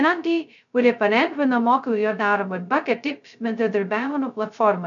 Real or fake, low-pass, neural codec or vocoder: fake; 7.2 kHz; codec, 16 kHz, 0.2 kbps, FocalCodec